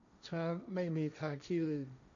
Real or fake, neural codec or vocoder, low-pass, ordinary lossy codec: fake; codec, 16 kHz, 1.1 kbps, Voila-Tokenizer; 7.2 kHz; MP3, 96 kbps